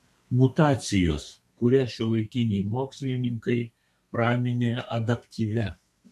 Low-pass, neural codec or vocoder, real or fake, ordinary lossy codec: 14.4 kHz; codec, 32 kHz, 1.9 kbps, SNAC; fake; MP3, 96 kbps